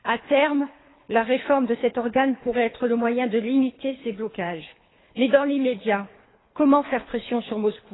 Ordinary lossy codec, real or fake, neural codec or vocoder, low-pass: AAC, 16 kbps; fake; codec, 24 kHz, 3 kbps, HILCodec; 7.2 kHz